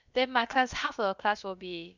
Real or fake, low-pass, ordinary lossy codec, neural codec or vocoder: fake; 7.2 kHz; none; codec, 16 kHz, about 1 kbps, DyCAST, with the encoder's durations